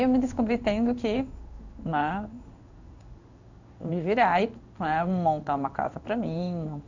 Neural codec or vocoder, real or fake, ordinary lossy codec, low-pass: codec, 16 kHz in and 24 kHz out, 1 kbps, XY-Tokenizer; fake; none; 7.2 kHz